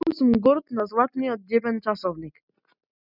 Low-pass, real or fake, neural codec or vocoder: 5.4 kHz; real; none